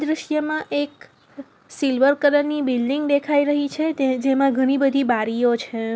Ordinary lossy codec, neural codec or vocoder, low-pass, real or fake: none; none; none; real